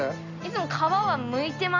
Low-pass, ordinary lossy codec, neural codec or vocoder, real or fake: 7.2 kHz; none; none; real